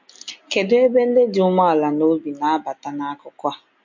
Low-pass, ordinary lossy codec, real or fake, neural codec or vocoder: 7.2 kHz; MP3, 48 kbps; real; none